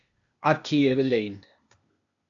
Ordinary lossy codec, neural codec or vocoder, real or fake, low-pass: AAC, 64 kbps; codec, 16 kHz, 0.8 kbps, ZipCodec; fake; 7.2 kHz